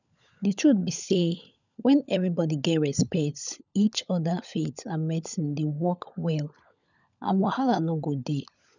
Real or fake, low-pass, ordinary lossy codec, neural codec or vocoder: fake; 7.2 kHz; none; codec, 16 kHz, 16 kbps, FunCodec, trained on LibriTTS, 50 frames a second